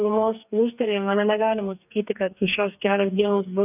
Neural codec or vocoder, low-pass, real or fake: codec, 44.1 kHz, 2.6 kbps, DAC; 3.6 kHz; fake